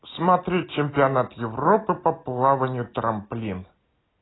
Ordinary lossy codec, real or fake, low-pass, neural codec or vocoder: AAC, 16 kbps; real; 7.2 kHz; none